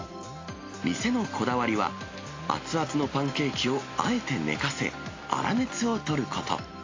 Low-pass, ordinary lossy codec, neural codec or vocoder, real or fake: 7.2 kHz; AAC, 32 kbps; none; real